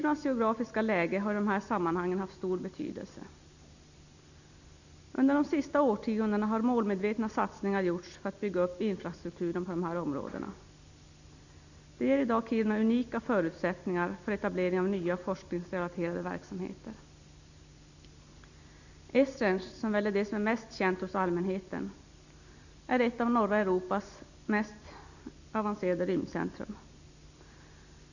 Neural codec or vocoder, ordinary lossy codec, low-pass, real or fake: none; none; 7.2 kHz; real